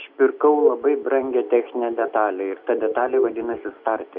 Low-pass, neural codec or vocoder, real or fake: 5.4 kHz; none; real